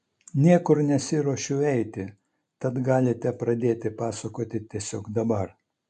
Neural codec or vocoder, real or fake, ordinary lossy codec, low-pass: none; real; MP3, 64 kbps; 10.8 kHz